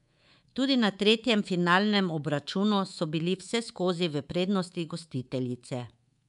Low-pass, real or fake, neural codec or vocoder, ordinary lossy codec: 10.8 kHz; fake; codec, 24 kHz, 3.1 kbps, DualCodec; none